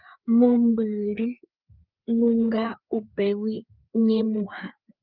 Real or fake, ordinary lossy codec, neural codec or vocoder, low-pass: fake; Opus, 24 kbps; codec, 16 kHz, 4 kbps, FreqCodec, larger model; 5.4 kHz